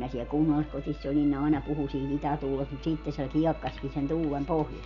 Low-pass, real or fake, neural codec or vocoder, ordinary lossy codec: 7.2 kHz; real; none; none